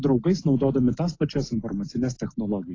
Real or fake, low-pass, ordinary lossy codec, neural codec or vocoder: real; 7.2 kHz; AAC, 32 kbps; none